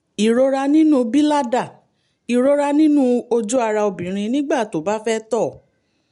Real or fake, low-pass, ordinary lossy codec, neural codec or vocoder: real; 10.8 kHz; MP3, 64 kbps; none